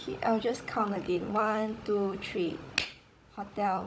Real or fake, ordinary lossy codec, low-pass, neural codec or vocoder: fake; none; none; codec, 16 kHz, 16 kbps, FunCodec, trained on Chinese and English, 50 frames a second